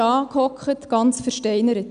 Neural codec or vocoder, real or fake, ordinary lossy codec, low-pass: none; real; none; 9.9 kHz